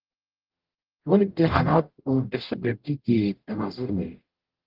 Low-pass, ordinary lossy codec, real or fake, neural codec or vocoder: 5.4 kHz; Opus, 16 kbps; fake; codec, 44.1 kHz, 0.9 kbps, DAC